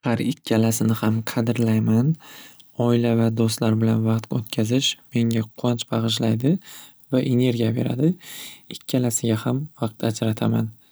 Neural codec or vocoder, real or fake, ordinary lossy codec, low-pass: none; real; none; none